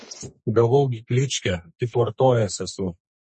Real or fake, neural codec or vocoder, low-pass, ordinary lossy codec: fake; codec, 32 kHz, 1.9 kbps, SNAC; 10.8 kHz; MP3, 32 kbps